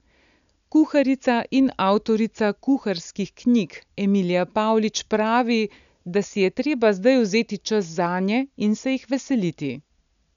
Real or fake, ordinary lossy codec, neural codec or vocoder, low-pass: real; none; none; 7.2 kHz